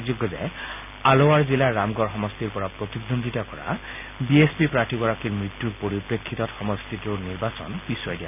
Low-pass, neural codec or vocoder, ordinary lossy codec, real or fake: 3.6 kHz; none; none; real